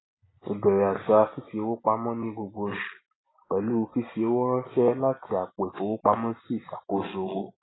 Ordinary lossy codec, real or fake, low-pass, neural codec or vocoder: AAC, 16 kbps; real; 7.2 kHz; none